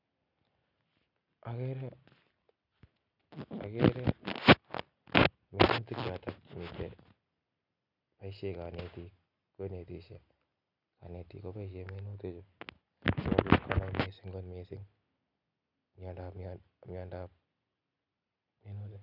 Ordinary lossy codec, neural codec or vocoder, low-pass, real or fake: none; none; 5.4 kHz; real